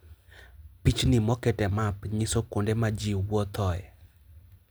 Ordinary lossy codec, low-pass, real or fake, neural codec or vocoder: none; none; real; none